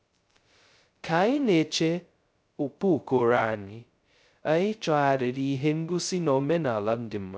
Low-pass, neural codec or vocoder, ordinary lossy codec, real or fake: none; codec, 16 kHz, 0.2 kbps, FocalCodec; none; fake